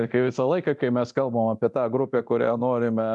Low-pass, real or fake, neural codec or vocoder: 10.8 kHz; fake; codec, 24 kHz, 0.9 kbps, DualCodec